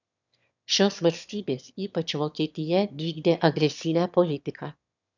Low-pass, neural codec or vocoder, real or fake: 7.2 kHz; autoencoder, 22.05 kHz, a latent of 192 numbers a frame, VITS, trained on one speaker; fake